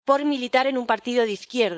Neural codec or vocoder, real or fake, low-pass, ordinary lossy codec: codec, 16 kHz, 4.8 kbps, FACodec; fake; none; none